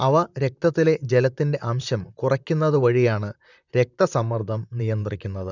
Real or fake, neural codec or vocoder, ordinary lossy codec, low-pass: fake; vocoder, 44.1 kHz, 128 mel bands, Pupu-Vocoder; none; 7.2 kHz